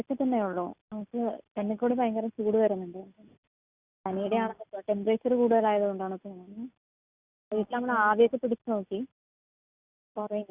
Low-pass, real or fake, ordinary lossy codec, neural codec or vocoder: 3.6 kHz; real; Opus, 64 kbps; none